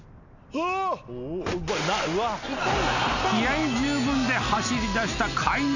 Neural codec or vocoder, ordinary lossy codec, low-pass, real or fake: none; none; 7.2 kHz; real